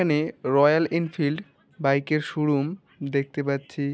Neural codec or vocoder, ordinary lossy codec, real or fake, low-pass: none; none; real; none